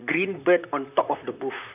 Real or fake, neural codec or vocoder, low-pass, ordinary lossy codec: fake; vocoder, 44.1 kHz, 128 mel bands, Pupu-Vocoder; 3.6 kHz; none